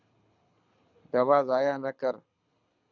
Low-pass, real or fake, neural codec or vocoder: 7.2 kHz; fake; codec, 24 kHz, 6 kbps, HILCodec